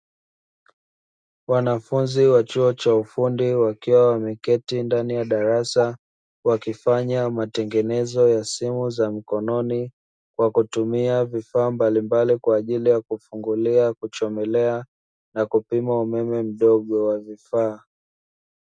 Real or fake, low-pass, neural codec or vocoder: real; 9.9 kHz; none